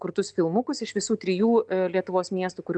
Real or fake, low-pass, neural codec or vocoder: real; 9.9 kHz; none